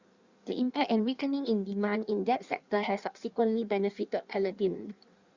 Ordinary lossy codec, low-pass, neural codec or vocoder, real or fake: Opus, 64 kbps; 7.2 kHz; codec, 16 kHz in and 24 kHz out, 1.1 kbps, FireRedTTS-2 codec; fake